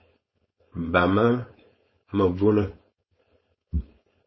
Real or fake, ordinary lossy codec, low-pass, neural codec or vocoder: fake; MP3, 24 kbps; 7.2 kHz; codec, 16 kHz, 4.8 kbps, FACodec